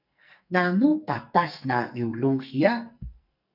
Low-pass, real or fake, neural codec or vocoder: 5.4 kHz; fake; codec, 44.1 kHz, 2.6 kbps, SNAC